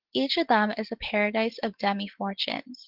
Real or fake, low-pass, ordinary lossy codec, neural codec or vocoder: real; 5.4 kHz; Opus, 16 kbps; none